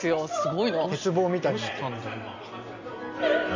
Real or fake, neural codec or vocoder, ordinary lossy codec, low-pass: real; none; MP3, 64 kbps; 7.2 kHz